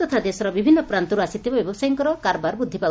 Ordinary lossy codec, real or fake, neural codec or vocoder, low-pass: none; real; none; none